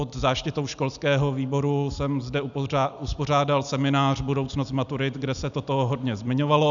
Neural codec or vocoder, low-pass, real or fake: none; 7.2 kHz; real